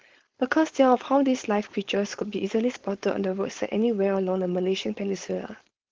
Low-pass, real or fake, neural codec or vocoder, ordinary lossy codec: 7.2 kHz; fake; codec, 16 kHz, 4.8 kbps, FACodec; Opus, 16 kbps